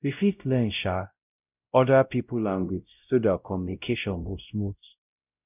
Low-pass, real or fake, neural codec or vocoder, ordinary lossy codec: 3.6 kHz; fake; codec, 16 kHz, 0.5 kbps, X-Codec, WavLM features, trained on Multilingual LibriSpeech; none